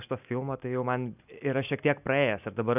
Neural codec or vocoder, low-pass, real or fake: none; 3.6 kHz; real